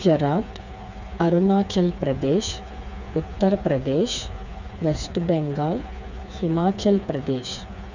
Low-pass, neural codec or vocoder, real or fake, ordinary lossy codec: 7.2 kHz; codec, 16 kHz, 4 kbps, FreqCodec, smaller model; fake; none